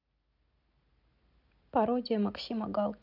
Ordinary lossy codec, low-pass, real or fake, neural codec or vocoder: none; 5.4 kHz; real; none